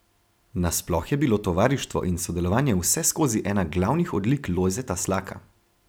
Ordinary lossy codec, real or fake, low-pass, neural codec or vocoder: none; fake; none; vocoder, 44.1 kHz, 128 mel bands every 256 samples, BigVGAN v2